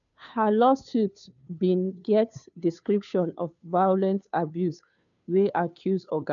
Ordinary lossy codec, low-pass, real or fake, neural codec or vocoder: none; 7.2 kHz; fake; codec, 16 kHz, 2 kbps, FunCodec, trained on Chinese and English, 25 frames a second